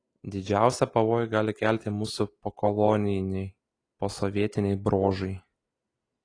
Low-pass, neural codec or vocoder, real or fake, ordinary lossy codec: 9.9 kHz; none; real; AAC, 32 kbps